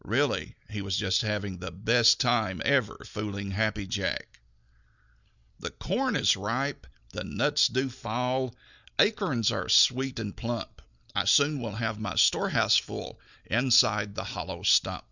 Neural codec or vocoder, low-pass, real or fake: none; 7.2 kHz; real